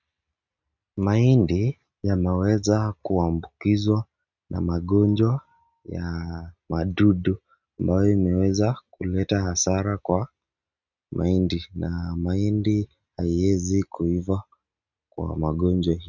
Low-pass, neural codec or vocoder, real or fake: 7.2 kHz; none; real